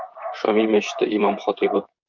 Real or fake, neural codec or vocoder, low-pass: fake; vocoder, 44.1 kHz, 128 mel bands, Pupu-Vocoder; 7.2 kHz